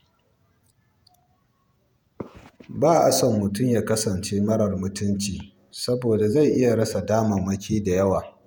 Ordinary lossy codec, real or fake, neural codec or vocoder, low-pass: none; fake; vocoder, 48 kHz, 128 mel bands, Vocos; none